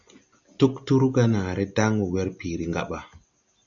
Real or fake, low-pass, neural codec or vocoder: real; 7.2 kHz; none